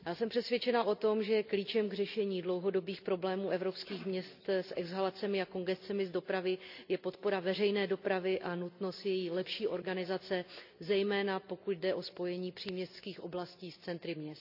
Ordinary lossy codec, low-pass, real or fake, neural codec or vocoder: none; 5.4 kHz; real; none